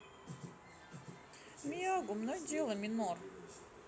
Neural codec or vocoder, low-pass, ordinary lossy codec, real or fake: none; none; none; real